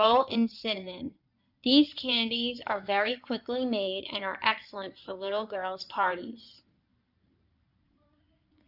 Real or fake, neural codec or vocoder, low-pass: fake; codec, 16 kHz in and 24 kHz out, 2.2 kbps, FireRedTTS-2 codec; 5.4 kHz